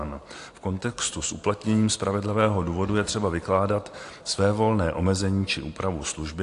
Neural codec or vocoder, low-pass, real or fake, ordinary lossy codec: none; 10.8 kHz; real; AAC, 48 kbps